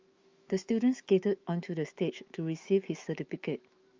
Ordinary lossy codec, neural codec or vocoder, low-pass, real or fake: Opus, 32 kbps; autoencoder, 48 kHz, 128 numbers a frame, DAC-VAE, trained on Japanese speech; 7.2 kHz; fake